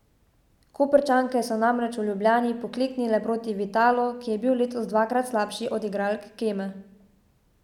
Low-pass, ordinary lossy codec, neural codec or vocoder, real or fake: 19.8 kHz; none; none; real